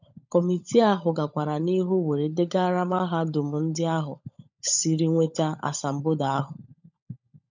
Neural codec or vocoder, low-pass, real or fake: codec, 16 kHz, 16 kbps, FunCodec, trained on LibriTTS, 50 frames a second; 7.2 kHz; fake